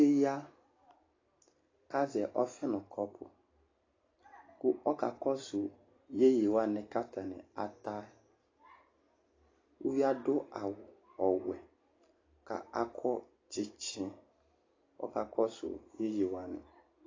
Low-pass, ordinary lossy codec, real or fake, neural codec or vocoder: 7.2 kHz; AAC, 32 kbps; real; none